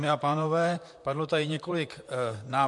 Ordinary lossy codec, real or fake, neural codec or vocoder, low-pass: MP3, 64 kbps; fake; vocoder, 44.1 kHz, 128 mel bands, Pupu-Vocoder; 10.8 kHz